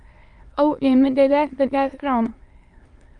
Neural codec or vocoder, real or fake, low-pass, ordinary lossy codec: autoencoder, 22.05 kHz, a latent of 192 numbers a frame, VITS, trained on many speakers; fake; 9.9 kHz; Opus, 32 kbps